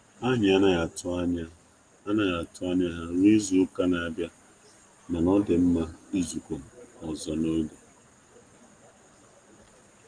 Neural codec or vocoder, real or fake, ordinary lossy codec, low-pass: none; real; Opus, 24 kbps; 9.9 kHz